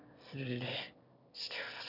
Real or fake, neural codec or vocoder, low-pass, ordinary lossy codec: fake; autoencoder, 22.05 kHz, a latent of 192 numbers a frame, VITS, trained on one speaker; 5.4 kHz; none